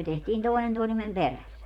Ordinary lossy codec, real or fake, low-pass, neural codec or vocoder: none; fake; 19.8 kHz; vocoder, 44.1 kHz, 128 mel bands, Pupu-Vocoder